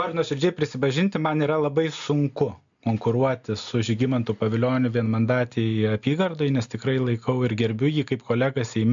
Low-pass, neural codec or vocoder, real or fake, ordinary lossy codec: 7.2 kHz; none; real; MP3, 64 kbps